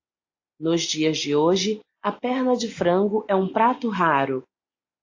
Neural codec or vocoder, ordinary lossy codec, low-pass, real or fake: none; AAC, 48 kbps; 7.2 kHz; real